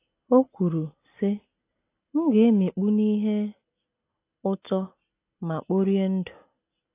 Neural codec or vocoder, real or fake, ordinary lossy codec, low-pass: none; real; AAC, 24 kbps; 3.6 kHz